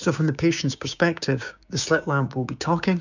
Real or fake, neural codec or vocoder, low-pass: fake; codec, 16 kHz, 6 kbps, DAC; 7.2 kHz